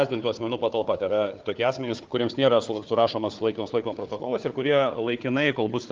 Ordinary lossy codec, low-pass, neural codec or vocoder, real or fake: Opus, 32 kbps; 7.2 kHz; codec, 16 kHz, 4 kbps, FunCodec, trained on Chinese and English, 50 frames a second; fake